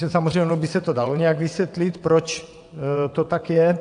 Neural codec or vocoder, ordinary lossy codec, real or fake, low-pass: vocoder, 22.05 kHz, 80 mel bands, WaveNeXt; AAC, 64 kbps; fake; 9.9 kHz